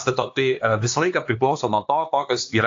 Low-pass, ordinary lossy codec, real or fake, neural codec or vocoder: 7.2 kHz; AAC, 48 kbps; fake; codec, 16 kHz, 2 kbps, X-Codec, HuBERT features, trained on LibriSpeech